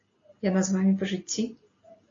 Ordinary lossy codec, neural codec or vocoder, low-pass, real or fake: AAC, 32 kbps; none; 7.2 kHz; real